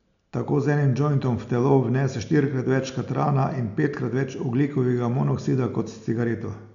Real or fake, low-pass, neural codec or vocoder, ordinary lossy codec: real; 7.2 kHz; none; none